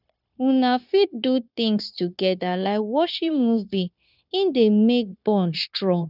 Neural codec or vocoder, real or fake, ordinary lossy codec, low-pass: codec, 16 kHz, 0.9 kbps, LongCat-Audio-Codec; fake; none; 5.4 kHz